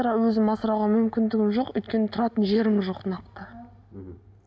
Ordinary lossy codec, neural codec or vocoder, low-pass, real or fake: none; none; none; real